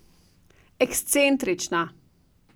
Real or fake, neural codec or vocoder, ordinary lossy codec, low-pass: real; none; none; none